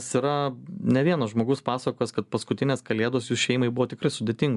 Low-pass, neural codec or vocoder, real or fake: 10.8 kHz; none; real